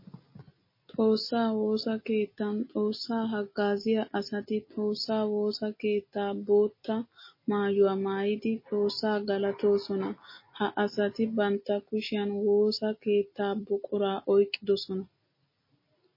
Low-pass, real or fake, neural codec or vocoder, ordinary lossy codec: 5.4 kHz; real; none; MP3, 24 kbps